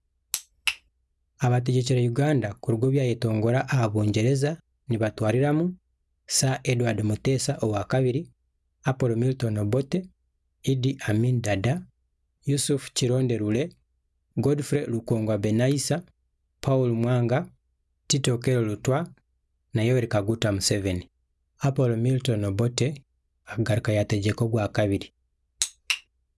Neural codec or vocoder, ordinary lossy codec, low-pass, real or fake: none; none; none; real